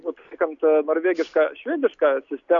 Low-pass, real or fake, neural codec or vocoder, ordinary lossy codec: 7.2 kHz; real; none; MP3, 64 kbps